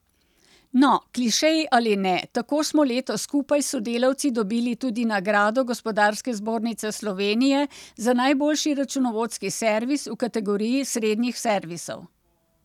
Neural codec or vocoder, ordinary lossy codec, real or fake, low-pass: none; none; real; 19.8 kHz